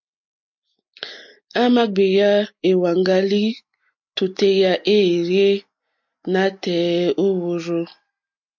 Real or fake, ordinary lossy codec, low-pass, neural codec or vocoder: real; MP3, 48 kbps; 7.2 kHz; none